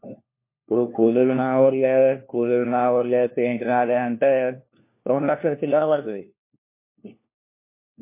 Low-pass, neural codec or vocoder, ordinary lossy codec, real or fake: 3.6 kHz; codec, 16 kHz, 1 kbps, FunCodec, trained on LibriTTS, 50 frames a second; MP3, 24 kbps; fake